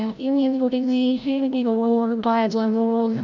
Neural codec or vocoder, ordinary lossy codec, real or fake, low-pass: codec, 16 kHz, 0.5 kbps, FreqCodec, larger model; none; fake; 7.2 kHz